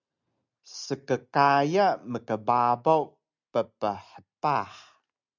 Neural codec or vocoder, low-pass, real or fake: none; 7.2 kHz; real